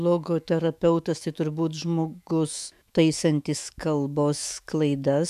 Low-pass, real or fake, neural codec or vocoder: 14.4 kHz; fake; autoencoder, 48 kHz, 128 numbers a frame, DAC-VAE, trained on Japanese speech